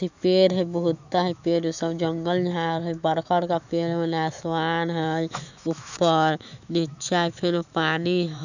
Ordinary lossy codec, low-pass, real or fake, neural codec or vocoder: none; 7.2 kHz; fake; autoencoder, 48 kHz, 128 numbers a frame, DAC-VAE, trained on Japanese speech